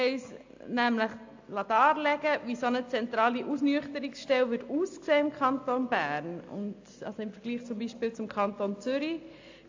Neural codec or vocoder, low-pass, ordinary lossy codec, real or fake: none; 7.2 kHz; AAC, 48 kbps; real